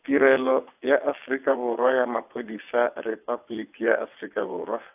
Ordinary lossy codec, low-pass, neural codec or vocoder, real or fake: AAC, 32 kbps; 3.6 kHz; vocoder, 22.05 kHz, 80 mel bands, WaveNeXt; fake